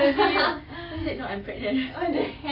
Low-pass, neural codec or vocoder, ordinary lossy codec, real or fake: 5.4 kHz; none; AAC, 24 kbps; real